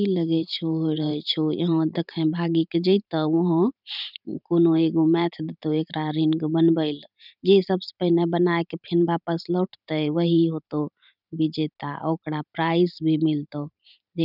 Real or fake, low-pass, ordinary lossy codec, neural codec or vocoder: fake; 5.4 kHz; none; vocoder, 44.1 kHz, 128 mel bands every 512 samples, BigVGAN v2